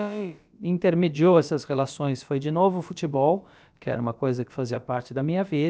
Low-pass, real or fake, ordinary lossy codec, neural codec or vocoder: none; fake; none; codec, 16 kHz, about 1 kbps, DyCAST, with the encoder's durations